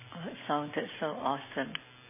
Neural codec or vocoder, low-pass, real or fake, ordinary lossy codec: none; 3.6 kHz; real; MP3, 16 kbps